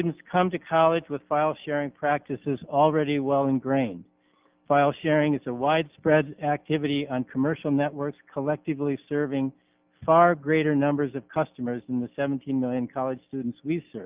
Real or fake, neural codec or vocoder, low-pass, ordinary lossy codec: real; none; 3.6 kHz; Opus, 32 kbps